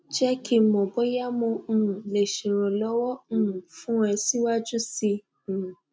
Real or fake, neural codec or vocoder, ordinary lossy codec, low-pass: real; none; none; none